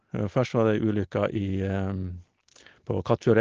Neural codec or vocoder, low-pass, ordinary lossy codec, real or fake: none; 7.2 kHz; Opus, 16 kbps; real